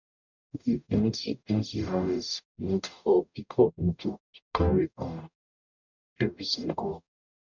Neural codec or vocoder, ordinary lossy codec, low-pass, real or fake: codec, 44.1 kHz, 0.9 kbps, DAC; none; 7.2 kHz; fake